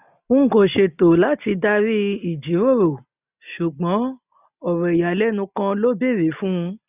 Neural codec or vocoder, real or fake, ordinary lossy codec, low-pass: none; real; none; 3.6 kHz